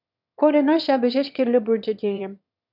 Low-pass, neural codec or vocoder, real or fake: 5.4 kHz; autoencoder, 22.05 kHz, a latent of 192 numbers a frame, VITS, trained on one speaker; fake